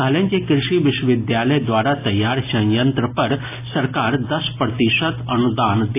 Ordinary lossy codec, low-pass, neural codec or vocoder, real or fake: AAC, 24 kbps; 3.6 kHz; none; real